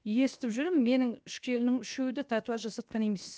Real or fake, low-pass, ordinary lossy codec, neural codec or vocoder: fake; none; none; codec, 16 kHz, 0.7 kbps, FocalCodec